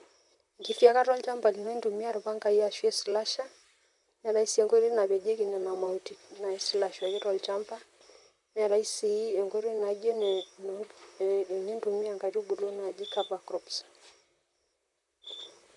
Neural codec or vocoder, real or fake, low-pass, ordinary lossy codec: vocoder, 44.1 kHz, 128 mel bands, Pupu-Vocoder; fake; 10.8 kHz; none